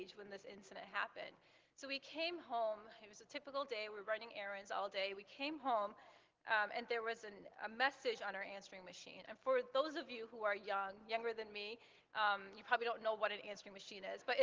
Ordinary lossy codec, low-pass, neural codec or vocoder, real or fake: Opus, 16 kbps; 7.2 kHz; none; real